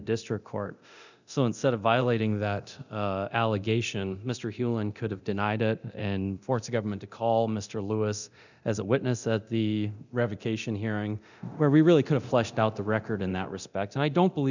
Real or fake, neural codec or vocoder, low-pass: fake; codec, 24 kHz, 0.9 kbps, DualCodec; 7.2 kHz